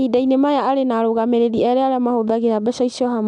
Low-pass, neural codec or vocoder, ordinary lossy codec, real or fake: 10.8 kHz; none; none; real